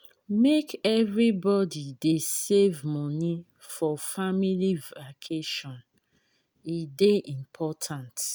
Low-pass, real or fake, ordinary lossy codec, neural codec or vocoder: none; real; none; none